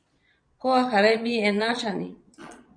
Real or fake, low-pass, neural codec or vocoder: fake; 9.9 kHz; vocoder, 22.05 kHz, 80 mel bands, Vocos